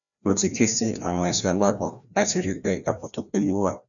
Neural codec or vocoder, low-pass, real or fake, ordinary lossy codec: codec, 16 kHz, 1 kbps, FreqCodec, larger model; 7.2 kHz; fake; none